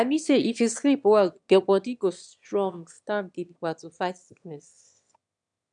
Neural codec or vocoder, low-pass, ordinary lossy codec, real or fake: autoencoder, 22.05 kHz, a latent of 192 numbers a frame, VITS, trained on one speaker; 9.9 kHz; none; fake